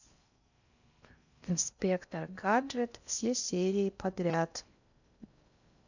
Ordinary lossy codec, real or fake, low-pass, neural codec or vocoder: none; fake; 7.2 kHz; codec, 16 kHz in and 24 kHz out, 0.6 kbps, FocalCodec, streaming, 4096 codes